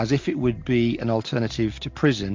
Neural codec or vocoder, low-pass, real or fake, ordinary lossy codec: none; 7.2 kHz; real; MP3, 48 kbps